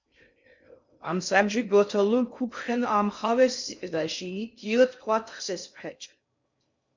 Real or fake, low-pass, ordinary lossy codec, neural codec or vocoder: fake; 7.2 kHz; MP3, 64 kbps; codec, 16 kHz in and 24 kHz out, 0.6 kbps, FocalCodec, streaming, 4096 codes